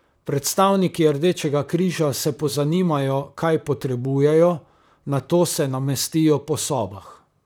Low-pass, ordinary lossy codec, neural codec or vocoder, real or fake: none; none; vocoder, 44.1 kHz, 128 mel bands, Pupu-Vocoder; fake